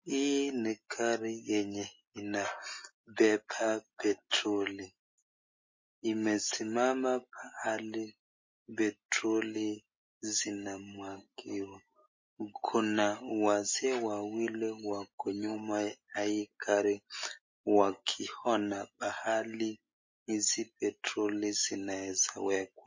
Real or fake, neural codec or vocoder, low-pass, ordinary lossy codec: real; none; 7.2 kHz; MP3, 32 kbps